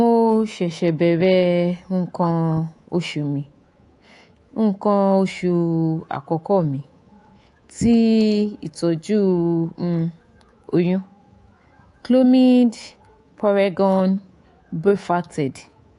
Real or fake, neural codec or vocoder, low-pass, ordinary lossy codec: fake; codec, 24 kHz, 3.1 kbps, DualCodec; 10.8 kHz; AAC, 48 kbps